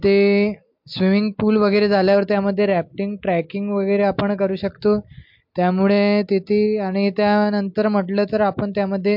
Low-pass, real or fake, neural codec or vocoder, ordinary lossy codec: 5.4 kHz; real; none; MP3, 48 kbps